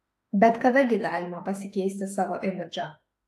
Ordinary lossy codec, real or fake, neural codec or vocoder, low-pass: AAC, 64 kbps; fake; autoencoder, 48 kHz, 32 numbers a frame, DAC-VAE, trained on Japanese speech; 14.4 kHz